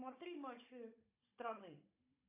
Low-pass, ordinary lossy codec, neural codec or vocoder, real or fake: 3.6 kHz; AAC, 32 kbps; codec, 16 kHz, 16 kbps, FunCodec, trained on Chinese and English, 50 frames a second; fake